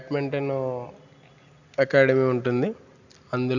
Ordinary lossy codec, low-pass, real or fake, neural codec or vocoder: none; 7.2 kHz; real; none